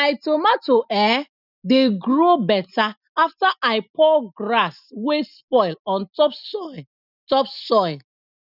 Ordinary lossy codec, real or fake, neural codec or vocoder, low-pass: none; real; none; 5.4 kHz